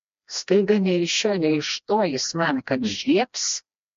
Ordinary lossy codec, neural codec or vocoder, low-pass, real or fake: MP3, 48 kbps; codec, 16 kHz, 1 kbps, FreqCodec, smaller model; 7.2 kHz; fake